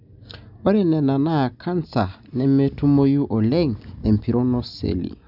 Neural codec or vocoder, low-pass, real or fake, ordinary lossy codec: none; 5.4 kHz; real; none